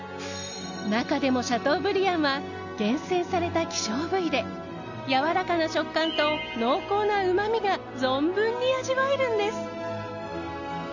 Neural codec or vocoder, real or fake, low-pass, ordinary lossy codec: none; real; 7.2 kHz; none